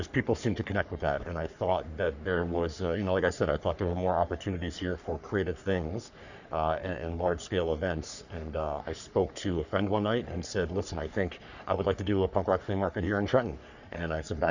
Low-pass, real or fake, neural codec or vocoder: 7.2 kHz; fake; codec, 44.1 kHz, 3.4 kbps, Pupu-Codec